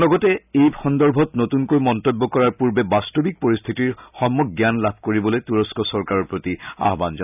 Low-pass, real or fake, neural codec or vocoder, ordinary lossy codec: 3.6 kHz; real; none; none